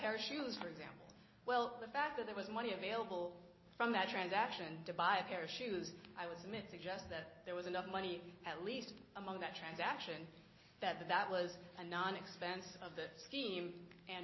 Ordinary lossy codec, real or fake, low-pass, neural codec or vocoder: MP3, 24 kbps; real; 7.2 kHz; none